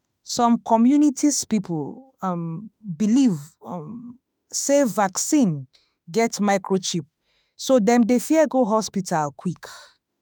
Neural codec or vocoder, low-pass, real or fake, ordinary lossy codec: autoencoder, 48 kHz, 32 numbers a frame, DAC-VAE, trained on Japanese speech; none; fake; none